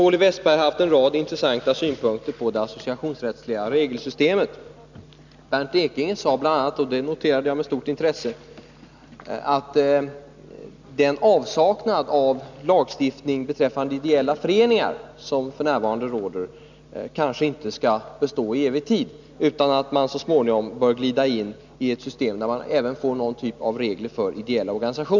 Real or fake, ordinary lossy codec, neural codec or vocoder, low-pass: real; none; none; 7.2 kHz